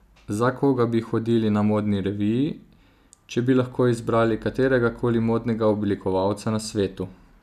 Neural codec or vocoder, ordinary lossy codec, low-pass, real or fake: none; none; 14.4 kHz; real